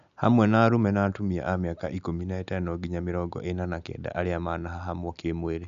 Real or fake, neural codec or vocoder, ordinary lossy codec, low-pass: real; none; none; 7.2 kHz